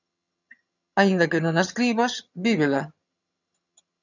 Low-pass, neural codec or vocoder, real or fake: 7.2 kHz; vocoder, 22.05 kHz, 80 mel bands, HiFi-GAN; fake